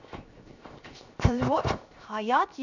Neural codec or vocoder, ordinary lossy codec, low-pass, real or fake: codec, 16 kHz, 0.7 kbps, FocalCodec; none; 7.2 kHz; fake